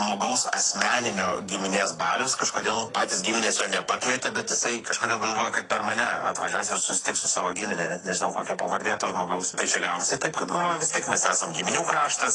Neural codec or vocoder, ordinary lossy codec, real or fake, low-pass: codec, 44.1 kHz, 2.6 kbps, SNAC; AAC, 32 kbps; fake; 10.8 kHz